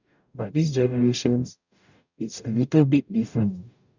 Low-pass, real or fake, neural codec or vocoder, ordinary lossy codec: 7.2 kHz; fake; codec, 44.1 kHz, 0.9 kbps, DAC; none